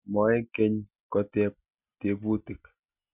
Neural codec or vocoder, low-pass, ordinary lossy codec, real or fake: none; 3.6 kHz; none; real